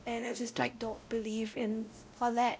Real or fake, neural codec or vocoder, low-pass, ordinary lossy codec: fake; codec, 16 kHz, 0.5 kbps, X-Codec, WavLM features, trained on Multilingual LibriSpeech; none; none